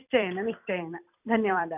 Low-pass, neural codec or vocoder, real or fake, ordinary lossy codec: 3.6 kHz; vocoder, 44.1 kHz, 128 mel bands every 256 samples, BigVGAN v2; fake; none